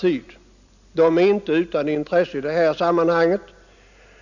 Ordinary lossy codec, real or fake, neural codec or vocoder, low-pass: none; real; none; 7.2 kHz